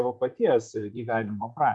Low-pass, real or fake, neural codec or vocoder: 10.8 kHz; fake; vocoder, 44.1 kHz, 128 mel bands, Pupu-Vocoder